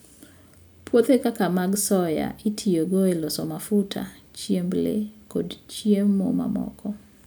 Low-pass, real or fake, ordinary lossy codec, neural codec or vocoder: none; real; none; none